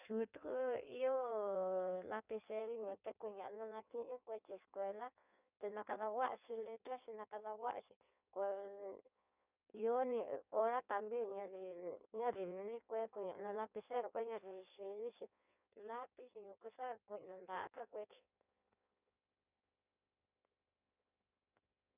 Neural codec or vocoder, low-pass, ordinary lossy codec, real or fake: codec, 16 kHz in and 24 kHz out, 1.1 kbps, FireRedTTS-2 codec; 3.6 kHz; none; fake